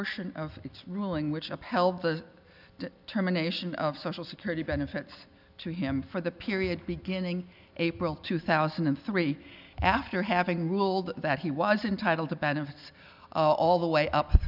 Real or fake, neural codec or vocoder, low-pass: real; none; 5.4 kHz